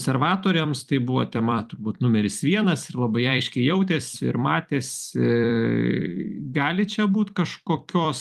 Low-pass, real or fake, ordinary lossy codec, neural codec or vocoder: 14.4 kHz; fake; Opus, 32 kbps; vocoder, 44.1 kHz, 128 mel bands every 256 samples, BigVGAN v2